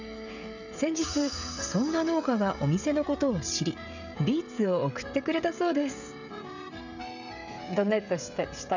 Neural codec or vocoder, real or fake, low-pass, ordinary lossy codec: codec, 16 kHz, 16 kbps, FreqCodec, smaller model; fake; 7.2 kHz; none